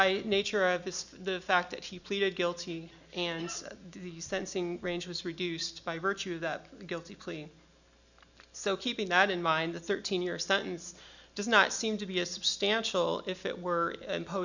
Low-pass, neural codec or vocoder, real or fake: 7.2 kHz; none; real